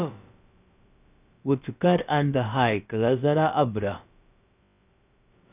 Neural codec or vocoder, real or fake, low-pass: codec, 16 kHz, about 1 kbps, DyCAST, with the encoder's durations; fake; 3.6 kHz